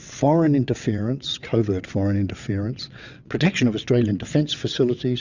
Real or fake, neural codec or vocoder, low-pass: fake; vocoder, 22.05 kHz, 80 mel bands, WaveNeXt; 7.2 kHz